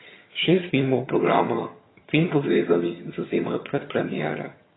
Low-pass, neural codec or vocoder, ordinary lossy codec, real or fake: 7.2 kHz; vocoder, 22.05 kHz, 80 mel bands, HiFi-GAN; AAC, 16 kbps; fake